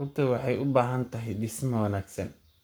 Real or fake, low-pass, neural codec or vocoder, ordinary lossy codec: fake; none; codec, 44.1 kHz, 7.8 kbps, Pupu-Codec; none